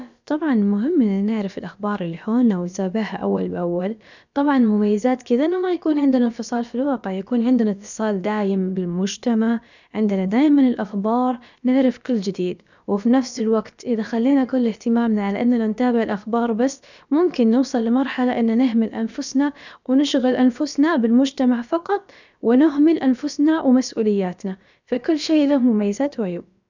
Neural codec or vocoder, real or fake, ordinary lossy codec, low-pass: codec, 16 kHz, about 1 kbps, DyCAST, with the encoder's durations; fake; none; 7.2 kHz